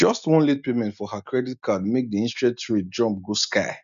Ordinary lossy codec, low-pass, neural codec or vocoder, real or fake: none; 7.2 kHz; none; real